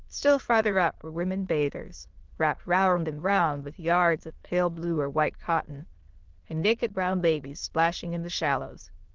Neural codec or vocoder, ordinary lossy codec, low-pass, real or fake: autoencoder, 22.05 kHz, a latent of 192 numbers a frame, VITS, trained on many speakers; Opus, 16 kbps; 7.2 kHz; fake